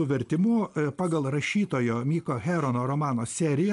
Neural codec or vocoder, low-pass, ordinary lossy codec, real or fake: none; 10.8 kHz; MP3, 96 kbps; real